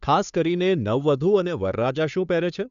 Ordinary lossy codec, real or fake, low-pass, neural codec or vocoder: none; fake; 7.2 kHz; codec, 16 kHz, 2 kbps, FunCodec, trained on Chinese and English, 25 frames a second